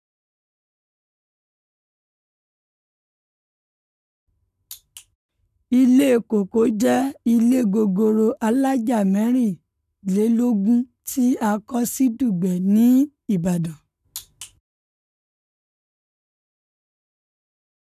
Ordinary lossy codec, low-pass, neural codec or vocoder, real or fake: none; 14.4 kHz; codec, 44.1 kHz, 7.8 kbps, Pupu-Codec; fake